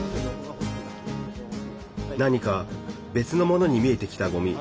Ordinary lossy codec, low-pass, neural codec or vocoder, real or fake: none; none; none; real